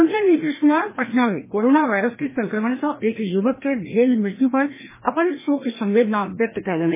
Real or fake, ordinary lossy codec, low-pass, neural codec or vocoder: fake; MP3, 16 kbps; 3.6 kHz; codec, 16 kHz, 1 kbps, FreqCodec, larger model